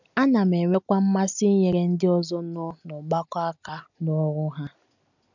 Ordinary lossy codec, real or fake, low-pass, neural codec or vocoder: none; real; 7.2 kHz; none